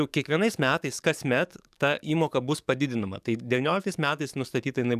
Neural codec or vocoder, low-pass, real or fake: codec, 44.1 kHz, 7.8 kbps, DAC; 14.4 kHz; fake